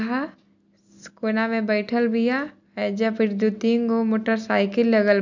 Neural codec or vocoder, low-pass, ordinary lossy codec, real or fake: none; 7.2 kHz; none; real